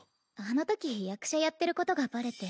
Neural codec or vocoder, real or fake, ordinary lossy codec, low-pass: none; real; none; none